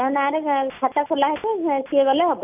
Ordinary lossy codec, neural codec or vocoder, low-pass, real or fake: none; none; 3.6 kHz; real